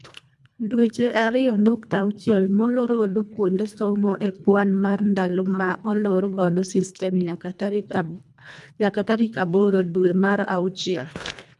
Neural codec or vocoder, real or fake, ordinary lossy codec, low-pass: codec, 24 kHz, 1.5 kbps, HILCodec; fake; none; none